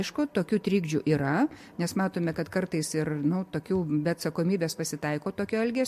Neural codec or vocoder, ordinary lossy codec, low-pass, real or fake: none; MP3, 64 kbps; 14.4 kHz; real